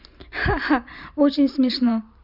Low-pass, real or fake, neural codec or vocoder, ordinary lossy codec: 5.4 kHz; fake; vocoder, 44.1 kHz, 128 mel bands every 512 samples, BigVGAN v2; none